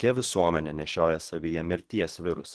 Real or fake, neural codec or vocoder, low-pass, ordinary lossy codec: fake; codec, 24 kHz, 3 kbps, HILCodec; 10.8 kHz; Opus, 16 kbps